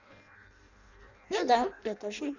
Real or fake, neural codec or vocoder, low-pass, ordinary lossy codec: fake; codec, 16 kHz in and 24 kHz out, 0.6 kbps, FireRedTTS-2 codec; 7.2 kHz; none